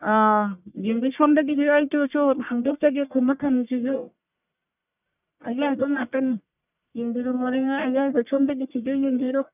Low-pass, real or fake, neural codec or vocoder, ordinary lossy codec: 3.6 kHz; fake; codec, 44.1 kHz, 1.7 kbps, Pupu-Codec; none